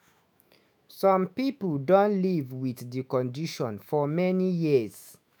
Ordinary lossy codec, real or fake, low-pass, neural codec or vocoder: none; fake; none; autoencoder, 48 kHz, 128 numbers a frame, DAC-VAE, trained on Japanese speech